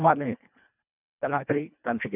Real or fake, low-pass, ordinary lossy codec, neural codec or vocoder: fake; 3.6 kHz; none; codec, 24 kHz, 1.5 kbps, HILCodec